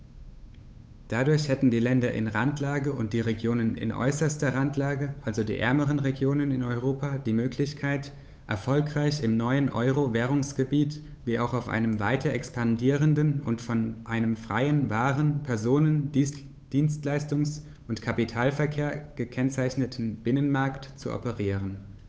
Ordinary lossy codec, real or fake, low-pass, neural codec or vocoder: none; fake; none; codec, 16 kHz, 8 kbps, FunCodec, trained on Chinese and English, 25 frames a second